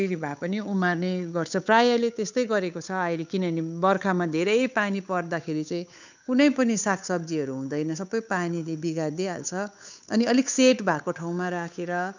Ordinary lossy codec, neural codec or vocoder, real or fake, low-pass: none; codec, 16 kHz, 8 kbps, FunCodec, trained on Chinese and English, 25 frames a second; fake; 7.2 kHz